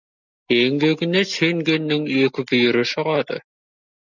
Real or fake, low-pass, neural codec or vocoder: real; 7.2 kHz; none